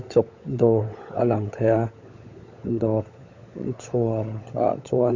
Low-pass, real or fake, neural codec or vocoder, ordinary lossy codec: 7.2 kHz; fake; codec, 16 kHz, 16 kbps, FunCodec, trained on LibriTTS, 50 frames a second; MP3, 48 kbps